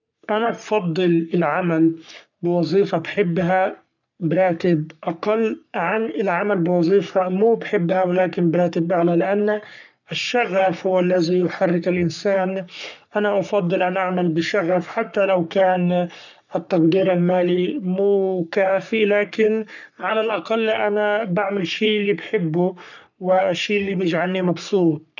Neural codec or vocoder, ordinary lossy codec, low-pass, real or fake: codec, 44.1 kHz, 3.4 kbps, Pupu-Codec; none; 7.2 kHz; fake